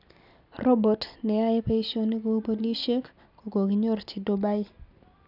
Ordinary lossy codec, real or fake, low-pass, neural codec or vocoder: none; real; 5.4 kHz; none